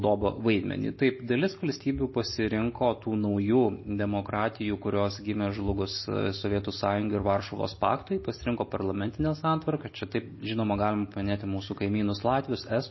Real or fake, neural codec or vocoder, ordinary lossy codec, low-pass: real; none; MP3, 24 kbps; 7.2 kHz